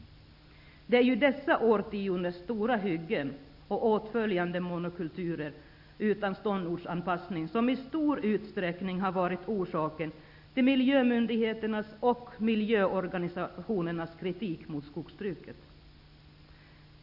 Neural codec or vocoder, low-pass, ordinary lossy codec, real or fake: none; 5.4 kHz; none; real